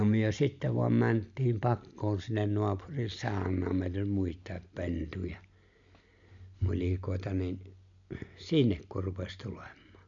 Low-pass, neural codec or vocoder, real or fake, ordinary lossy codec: 7.2 kHz; none; real; MP3, 64 kbps